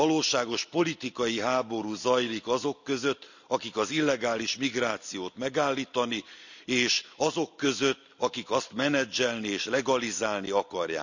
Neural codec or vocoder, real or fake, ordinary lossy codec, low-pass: none; real; none; 7.2 kHz